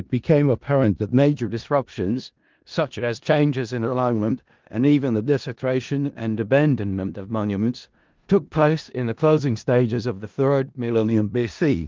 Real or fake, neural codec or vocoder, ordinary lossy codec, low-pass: fake; codec, 16 kHz in and 24 kHz out, 0.4 kbps, LongCat-Audio-Codec, four codebook decoder; Opus, 32 kbps; 7.2 kHz